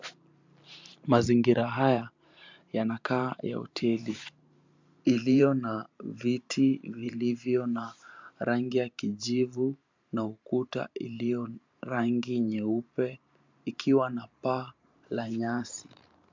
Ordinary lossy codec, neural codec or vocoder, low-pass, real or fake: MP3, 64 kbps; vocoder, 44.1 kHz, 128 mel bands every 512 samples, BigVGAN v2; 7.2 kHz; fake